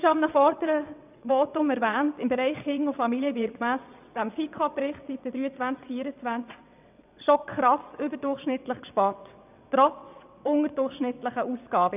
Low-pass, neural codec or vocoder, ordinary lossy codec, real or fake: 3.6 kHz; vocoder, 22.05 kHz, 80 mel bands, WaveNeXt; none; fake